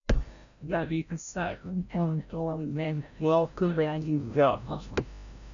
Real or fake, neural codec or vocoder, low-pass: fake; codec, 16 kHz, 0.5 kbps, FreqCodec, larger model; 7.2 kHz